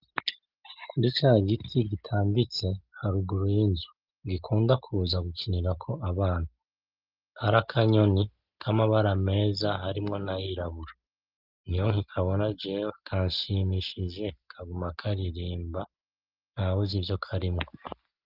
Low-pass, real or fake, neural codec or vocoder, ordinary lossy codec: 5.4 kHz; real; none; Opus, 32 kbps